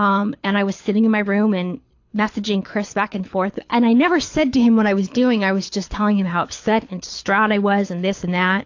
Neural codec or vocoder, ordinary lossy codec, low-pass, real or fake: codec, 24 kHz, 6 kbps, HILCodec; AAC, 48 kbps; 7.2 kHz; fake